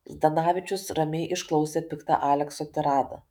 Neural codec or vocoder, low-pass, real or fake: autoencoder, 48 kHz, 128 numbers a frame, DAC-VAE, trained on Japanese speech; 19.8 kHz; fake